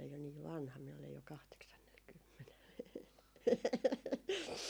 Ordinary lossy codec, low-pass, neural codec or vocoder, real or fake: none; none; none; real